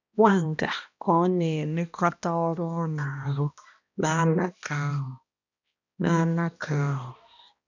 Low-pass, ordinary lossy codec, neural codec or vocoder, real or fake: 7.2 kHz; none; codec, 16 kHz, 1 kbps, X-Codec, HuBERT features, trained on balanced general audio; fake